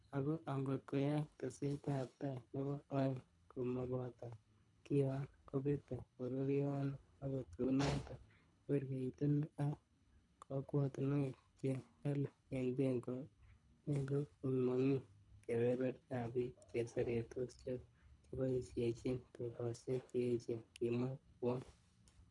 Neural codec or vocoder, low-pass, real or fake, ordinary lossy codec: codec, 24 kHz, 3 kbps, HILCodec; 10.8 kHz; fake; none